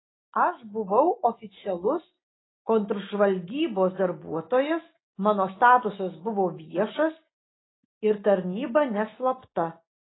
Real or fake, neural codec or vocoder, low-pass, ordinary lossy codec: real; none; 7.2 kHz; AAC, 16 kbps